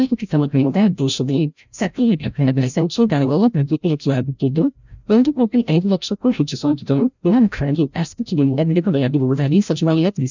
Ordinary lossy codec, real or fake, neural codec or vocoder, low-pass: none; fake; codec, 16 kHz, 0.5 kbps, FreqCodec, larger model; 7.2 kHz